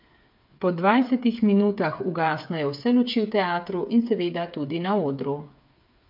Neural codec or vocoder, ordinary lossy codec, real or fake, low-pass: codec, 16 kHz, 8 kbps, FreqCodec, smaller model; MP3, 48 kbps; fake; 5.4 kHz